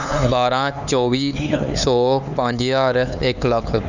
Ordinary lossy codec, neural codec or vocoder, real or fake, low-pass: none; codec, 16 kHz, 4 kbps, X-Codec, HuBERT features, trained on LibriSpeech; fake; 7.2 kHz